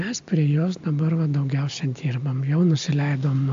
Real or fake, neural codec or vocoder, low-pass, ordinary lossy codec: real; none; 7.2 kHz; AAC, 64 kbps